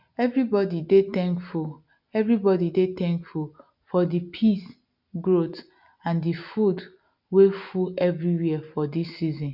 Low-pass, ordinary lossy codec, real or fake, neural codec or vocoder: 5.4 kHz; none; real; none